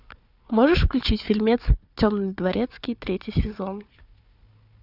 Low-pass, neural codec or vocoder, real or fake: 5.4 kHz; codec, 16 kHz, 4 kbps, FunCodec, trained on Chinese and English, 50 frames a second; fake